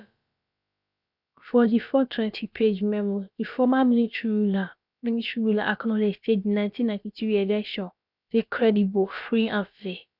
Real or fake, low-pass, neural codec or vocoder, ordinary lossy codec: fake; 5.4 kHz; codec, 16 kHz, about 1 kbps, DyCAST, with the encoder's durations; none